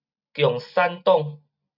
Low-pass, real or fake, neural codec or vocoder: 5.4 kHz; real; none